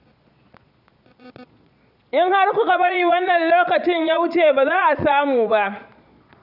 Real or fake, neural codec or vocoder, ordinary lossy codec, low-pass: fake; vocoder, 22.05 kHz, 80 mel bands, Vocos; none; 5.4 kHz